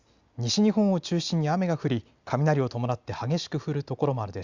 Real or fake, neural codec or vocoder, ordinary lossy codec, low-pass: real; none; Opus, 64 kbps; 7.2 kHz